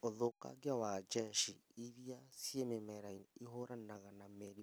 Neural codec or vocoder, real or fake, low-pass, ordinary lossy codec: none; real; none; none